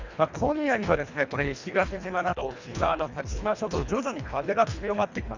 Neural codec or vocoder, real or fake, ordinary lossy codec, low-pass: codec, 24 kHz, 1.5 kbps, HILCodec; fake; Opus, 64 kbps; 7.2 kHz